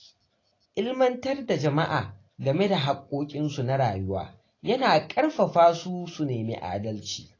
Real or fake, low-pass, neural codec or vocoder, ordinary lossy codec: real; 7.2 kHz; none; AAC, 32 kbps